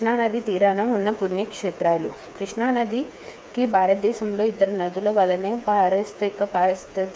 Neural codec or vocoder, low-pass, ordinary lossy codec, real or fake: codec, 16 kHz, 4 kbps, FreqCodec, smaller model; none; none; fake